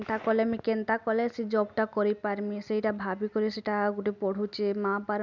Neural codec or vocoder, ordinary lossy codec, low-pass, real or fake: none; none; 7.2 kHz; real